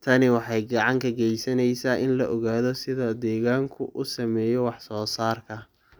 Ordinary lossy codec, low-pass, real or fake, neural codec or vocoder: none; none; real; none